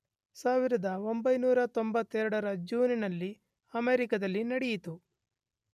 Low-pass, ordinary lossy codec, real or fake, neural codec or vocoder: 14.4 kHz; none; real; none